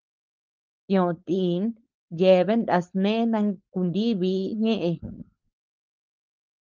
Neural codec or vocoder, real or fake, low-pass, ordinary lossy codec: codec, 16 kHz, 4.8 kbps, FACodec; fake; 7.2 kHz; Opus, 32 kbps